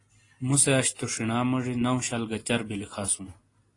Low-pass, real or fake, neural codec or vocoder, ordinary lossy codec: 10.8 kHz; real; none; AAC, 32 kbps